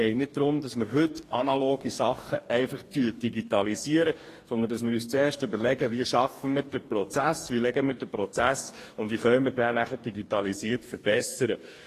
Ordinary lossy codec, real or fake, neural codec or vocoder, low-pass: AAC, 48 kbps; fake; codec, 44.1 kHz, 2.6 kbps, DAC; 14.4 kHz